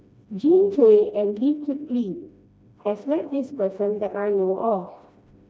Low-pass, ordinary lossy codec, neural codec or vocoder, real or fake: none; none; codec, 16 kHz, 1 kbps, FreqCodec, smaller model; fake